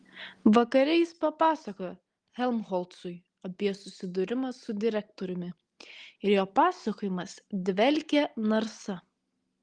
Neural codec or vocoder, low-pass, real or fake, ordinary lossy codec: none; 9.9 kHz; real; Opus, 24 kbps